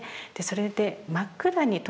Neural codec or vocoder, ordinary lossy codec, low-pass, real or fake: none; none; none; real